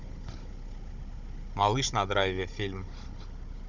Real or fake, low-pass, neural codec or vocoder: fake; 7.2 kHz; codec, 16 kHz, 16 kbps, FunCodec, trained on Chinese and English, 50 frames a second